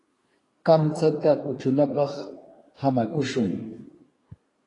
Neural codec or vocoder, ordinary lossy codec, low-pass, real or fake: codec, 24 kHz, 1 kbps, SNAC; AAC, 32 kbps; 10.8 kHz; fake